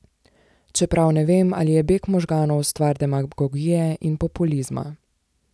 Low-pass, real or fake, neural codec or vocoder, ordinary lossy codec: none; real; none; none